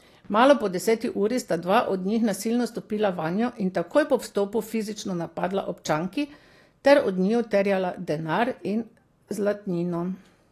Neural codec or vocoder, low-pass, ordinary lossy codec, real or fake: none; 14.4 kHz; AAC, 48 kbps; real